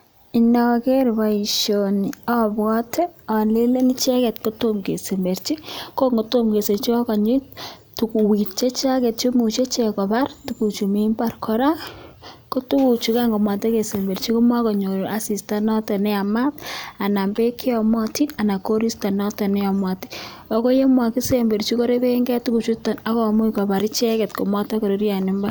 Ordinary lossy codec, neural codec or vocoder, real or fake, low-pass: none; none; real; none